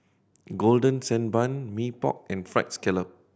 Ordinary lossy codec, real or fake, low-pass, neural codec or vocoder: none; real; none; none